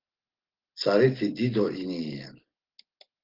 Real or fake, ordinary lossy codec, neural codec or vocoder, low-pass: real; Opus, 16 kbps; none; 5.4 kHz